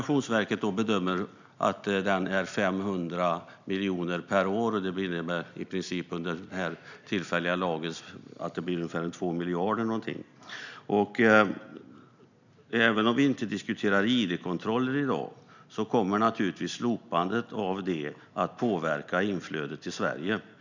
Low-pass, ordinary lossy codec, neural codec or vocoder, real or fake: 7.2 kHz; none; none; real